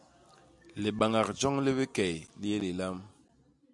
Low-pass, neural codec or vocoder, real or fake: 10.8 kHz; none; real